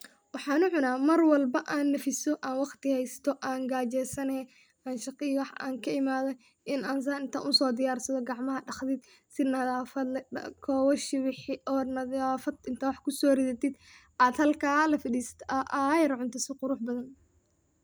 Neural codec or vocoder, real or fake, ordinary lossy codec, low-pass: none; real; none; none